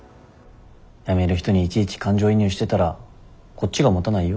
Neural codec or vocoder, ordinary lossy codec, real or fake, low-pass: none; none; real; none